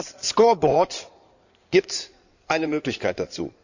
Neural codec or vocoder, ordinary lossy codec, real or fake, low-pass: codec, 16 kHz in and 24 kHz out, 2.2 kbps, FireRedTTS-2 codec; none; fake; 7.2 kHz